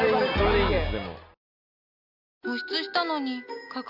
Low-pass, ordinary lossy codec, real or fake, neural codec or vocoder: 5.4 kHz; none; real; none